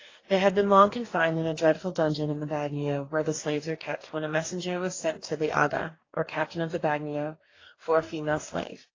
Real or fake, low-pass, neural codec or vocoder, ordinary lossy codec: fake; 7.2 kHz; codec, 44.1 kHz, 2.6 kbps, DAC; AAC, 32 kbps